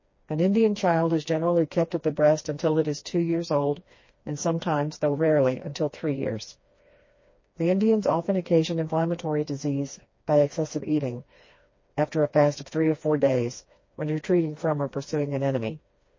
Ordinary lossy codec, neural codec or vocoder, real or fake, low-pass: MP3, 32 kbps; codec, 16 kHz, 2 kbps, FreqCodec, smaller model; fake; 7.2 kHz